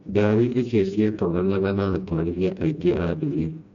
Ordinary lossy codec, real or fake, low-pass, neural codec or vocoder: MP3, 64 kbps; fake; 7.2 kHz; codec, 16 kHz, 1 kbps, FreqCodec, smaller model